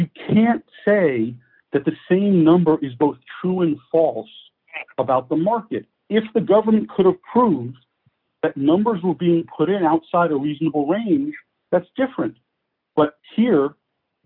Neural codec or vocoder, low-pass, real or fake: none; 5.4 kHz; real